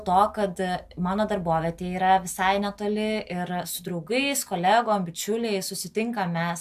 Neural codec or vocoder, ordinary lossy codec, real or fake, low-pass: none; AAC, 96 kbps; real; 14.4 kHz